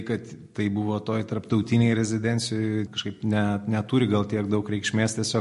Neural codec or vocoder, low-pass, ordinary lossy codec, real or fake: none; 14.4 kHz; MP3, 48 kbps; real